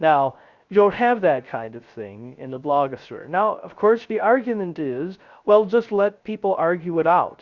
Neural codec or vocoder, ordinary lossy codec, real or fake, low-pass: codec, 16 kHz, 0.3 kbps, FocalCodec; Opus, 64 kbps; fake; 7.2 kHz